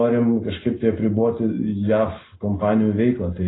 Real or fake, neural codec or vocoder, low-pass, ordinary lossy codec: real; none; 7.2 kHz; AAC, 16 kbps